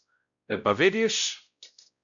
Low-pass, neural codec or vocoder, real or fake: 7.2 kHz; codec, 16 kHz, 0.5 kbps, X-Codec, WavLM features, trained on Multilingual LibriSpeech; fake